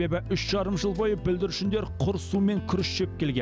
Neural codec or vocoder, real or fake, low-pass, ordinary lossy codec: none; real; none; none